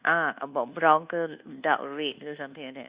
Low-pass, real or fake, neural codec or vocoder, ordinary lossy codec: 3.6 kHz; fake; codec, 24 kHz, 1.2 kbps, DualCodec; Opus, 64 kbps